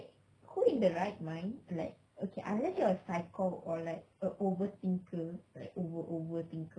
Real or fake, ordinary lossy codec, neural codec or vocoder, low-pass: real; none; none; 9.9 kHz